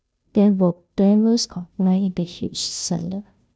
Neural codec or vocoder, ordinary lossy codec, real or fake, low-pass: codec, 16 kHz, 0.5 kbps, FunCodec, trained on Chinese and English, 25 frames a second; none; fake; none